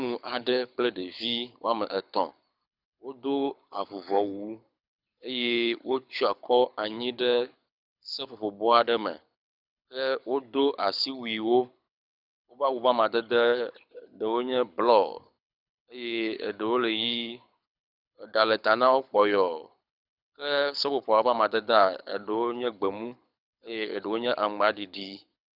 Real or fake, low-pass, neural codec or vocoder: fake; 5.4 kHz; codec, 24 kHz, 6 kbps, HILCodec